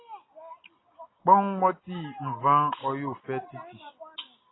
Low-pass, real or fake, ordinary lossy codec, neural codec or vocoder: 7.2 kHz; real; AAC, 16 kbps; none